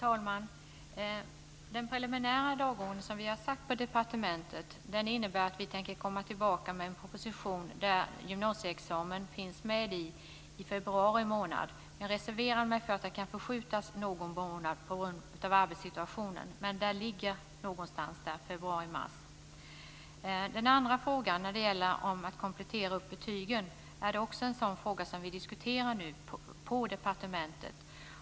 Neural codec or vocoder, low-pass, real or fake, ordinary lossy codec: none; none; real; none